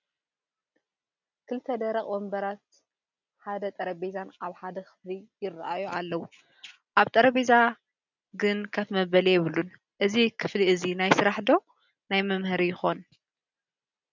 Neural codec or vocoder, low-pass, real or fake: none; 7.2 kHz; real